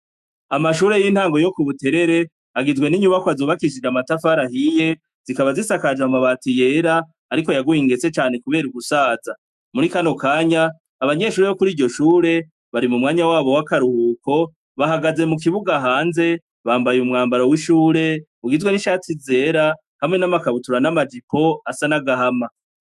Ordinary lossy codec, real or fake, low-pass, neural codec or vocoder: MP3, 96 kbps; fake; 14.4 kHz; autoencoder, 48 kHz, 128 numbers a frame, DAC-VAE, trained on Japanese speech